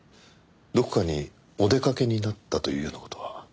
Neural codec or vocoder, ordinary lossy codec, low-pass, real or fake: none; none; none; real